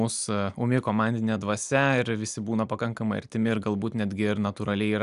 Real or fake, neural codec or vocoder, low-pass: real; none; 10.8 kHz